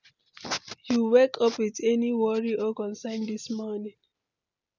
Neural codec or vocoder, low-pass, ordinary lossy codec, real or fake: none; 7.2 kHz; none; real